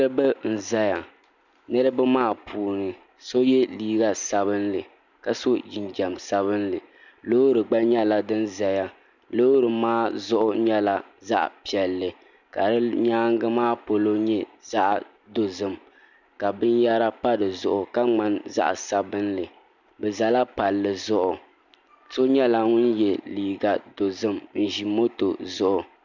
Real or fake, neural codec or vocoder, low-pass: real; none; 7.2 kHz